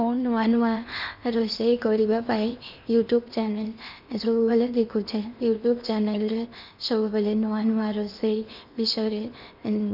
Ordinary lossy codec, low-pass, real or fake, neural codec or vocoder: none; 5.4 kHz; fake; codec, 16 kHz in and 24 kHz out, 0.8 kbps, FocalCodec, streaming, 65536 codes